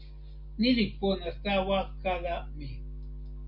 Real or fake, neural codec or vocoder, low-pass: real; none; 5.4 kHz